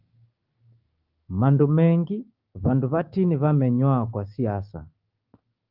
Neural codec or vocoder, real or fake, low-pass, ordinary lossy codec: codec, 16 kHz, 6 kbps, DAC; fake; 5.4 kHz; Opus, 32 kbps